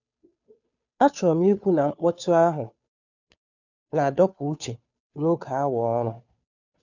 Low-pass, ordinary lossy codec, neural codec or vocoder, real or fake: 7.2 kHz; none; codec, 16 kHz, 2 kbps, FunCodec, trained on Chinese and English, 25 frames a second; fake